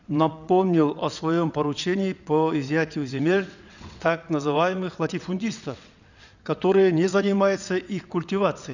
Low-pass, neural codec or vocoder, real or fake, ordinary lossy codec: 7.2 kHz; vocoder, 22.05 kHz, 80 mel bands, WaveNeXt; fake; none